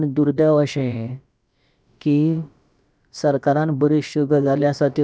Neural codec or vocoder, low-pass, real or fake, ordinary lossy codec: codec, 16 kHz, about 1 kbps, DyCAST, with the encoder's durations; none; fake; none